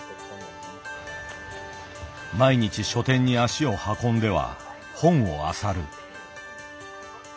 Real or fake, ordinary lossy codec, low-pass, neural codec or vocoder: real; none; none; none